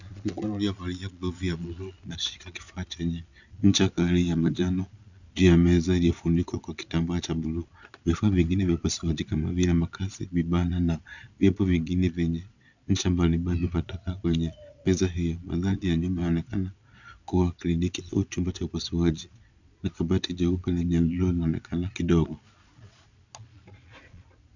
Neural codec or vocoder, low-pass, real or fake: vocoder, 22.05 kHz, 80 mel bands, Vocos; 7.2 kHz; fake